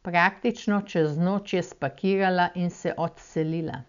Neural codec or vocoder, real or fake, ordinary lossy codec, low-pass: none; real; none; 7.2 kHz